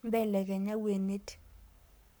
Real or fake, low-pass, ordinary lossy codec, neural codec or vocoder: fake; none; none; codec, 44.1 kHz, 7.8 kbps, Pupu-Codec